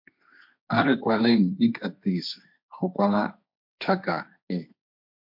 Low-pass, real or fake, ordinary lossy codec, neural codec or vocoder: 5.4 kHz; fake; MP3, 48 kbps; codec, 16 kHz, 1.1 kbps, Voila-Tokenizer